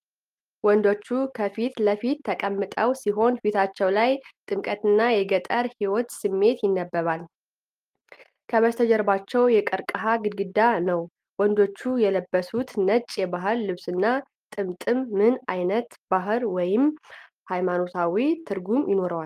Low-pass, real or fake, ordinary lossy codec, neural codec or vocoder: 14.4 kHz; real; Opus, 32 kbps; none